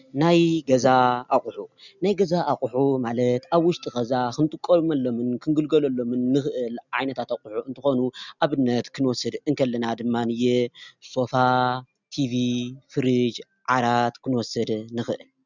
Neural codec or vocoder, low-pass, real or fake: none; 7.2 kHz; real